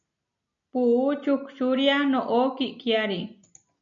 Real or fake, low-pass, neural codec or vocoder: real; 7.2 kHz; none